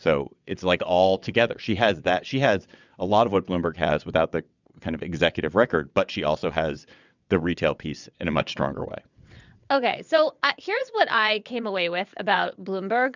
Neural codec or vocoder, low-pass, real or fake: vocoder, 22.05 kHz, 80 mel bands, WaveNeXt; 7.2 kHz; fake